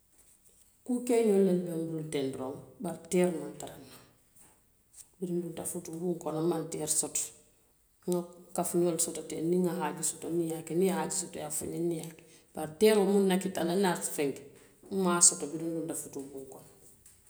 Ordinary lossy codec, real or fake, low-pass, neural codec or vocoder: none; real; none; none